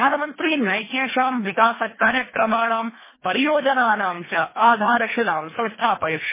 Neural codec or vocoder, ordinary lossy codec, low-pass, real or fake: codec, 24 kHz, 1.5 kbps, HILCodec; MP3, 16 kbps; 3.6 kHz; fake